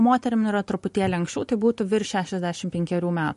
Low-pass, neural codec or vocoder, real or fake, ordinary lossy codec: 14.4 kHz; none; real; MP3, 48 kbps